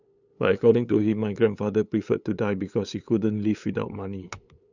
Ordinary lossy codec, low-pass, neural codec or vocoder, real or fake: none; 7.2 kHz; codec, 16 kHz, 8 kbps, FunCodec, trained on LibriTTS, 25 frames a second; fake